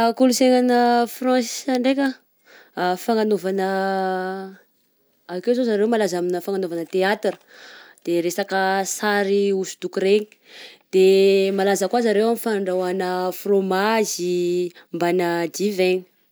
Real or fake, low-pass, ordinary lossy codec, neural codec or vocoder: real; none; none; none